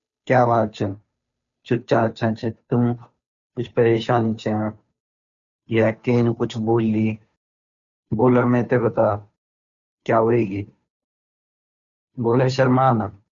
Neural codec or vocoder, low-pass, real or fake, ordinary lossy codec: codec, 16 kHz, 2 kbps, FunCodec, trained on Chinese and English, 25 frames a second; 7.2 kHz; fake; none